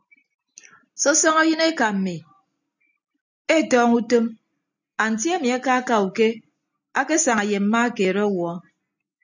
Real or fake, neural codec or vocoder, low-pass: real; none; 7.2 kHz